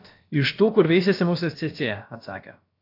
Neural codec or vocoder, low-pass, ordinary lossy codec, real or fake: codec, 16 kHz, about 1 kbps, DyCAST, with the encoder's durations; 5.4 kHz; AAC, 32 kbps; fake